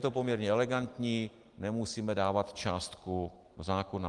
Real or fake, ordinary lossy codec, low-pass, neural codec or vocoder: real; Opus, 24 kbps; 10.8 kHz; none